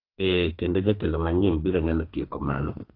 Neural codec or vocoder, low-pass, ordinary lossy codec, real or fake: codec, 32 kHz, 1.9 kbps, SNAC; 5.4 kHz; AAC, 32 kbps; fake